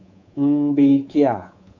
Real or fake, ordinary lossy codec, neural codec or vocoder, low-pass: fake; AAC, 48 kbps; codec, 24 kHz, 3.1 kbps, DualCodec; 7.2 kHz